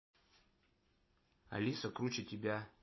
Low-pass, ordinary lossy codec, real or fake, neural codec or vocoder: 7.2 kHz; MP3, 24 kbps; real; none